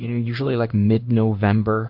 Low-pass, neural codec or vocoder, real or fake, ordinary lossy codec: 5.4 kHz; codec, 16 kHz in and 24 kHz out, 1 kbps, XY-Tokenizer; fake; Opus, 64 kbps